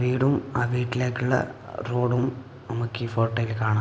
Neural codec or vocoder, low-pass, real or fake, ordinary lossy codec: none; none; real; none